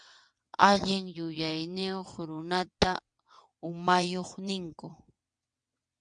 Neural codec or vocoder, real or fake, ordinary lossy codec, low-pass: vocoder, 22.05 kHz, 80 mel bands, WaveNeXt; fake; MP3, 96 kbps; 9.9 kHz